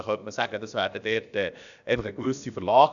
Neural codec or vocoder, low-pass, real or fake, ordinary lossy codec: codec, 16 kHz, about 1 kbps, DyCAST, with the encoder's durations; 7.2 kHz; fake; none